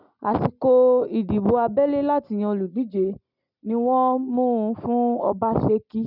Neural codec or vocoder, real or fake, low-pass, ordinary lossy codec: none; real; 5.4 kHz; none